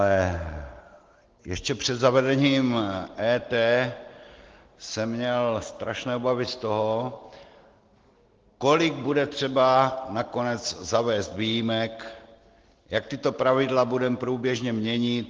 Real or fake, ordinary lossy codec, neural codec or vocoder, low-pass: real; Opus, 16 kbps; none; 7.2 kHz